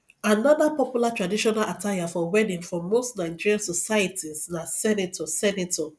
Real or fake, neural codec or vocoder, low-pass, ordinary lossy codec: real; none; none; none